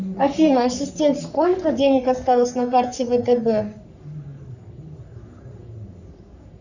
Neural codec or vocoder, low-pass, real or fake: codec, 44.1 kHz, 3.4 kbps, Pupu-Codec; 7.2 kHz; fake